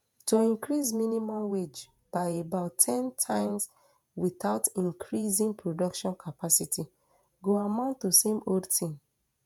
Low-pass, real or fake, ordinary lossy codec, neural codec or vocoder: 19.8 kHz; fake; none; vocoder, 48 kHz, 128 mel bands, Vocos